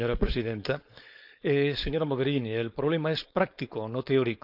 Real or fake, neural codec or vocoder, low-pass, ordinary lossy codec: fake; codec, 16 kHz, 4.8 kbps, FACodec; 5.4 kHz; none